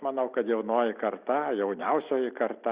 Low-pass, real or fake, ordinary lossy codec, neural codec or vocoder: 3.6 kHz; real; Opus, 64 kbps; none